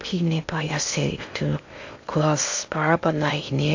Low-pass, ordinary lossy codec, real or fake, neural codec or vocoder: 7.2 kHz; AAC, 48 kbps; fake; codec, 16 kHz in and 24 kHz out, 0.6 kbps, FocalCodec, streaming, 2048 codes